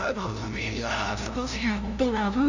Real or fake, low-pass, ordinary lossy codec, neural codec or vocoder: fake; 7.2 kHz; none; codec, 16 kHz, 0.5 kbps, FunCodec, trained on LibriTTS, 25 frames a second